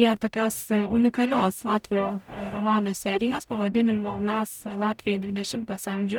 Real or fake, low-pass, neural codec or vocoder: fake; 19.8 kHz; codec, 44.1 kHz, 0.9 kbps, DAC